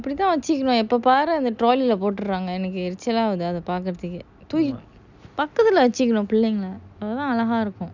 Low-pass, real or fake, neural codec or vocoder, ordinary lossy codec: 7.2 kHz; real; none; none